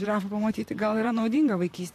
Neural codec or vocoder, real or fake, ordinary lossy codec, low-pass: vocoder, 44.1 kHz, 128 mel bands, Pupu-Vocoder; fake; AAC, 64 kbps; 14.4 kHz